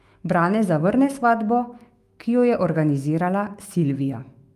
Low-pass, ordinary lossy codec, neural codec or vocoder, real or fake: 14.4 kHz; Opus, 32 kbps; autoencoder, 48 kHz, 128 numbers a frame, DAC-VAE, trained on Japanese speech; fake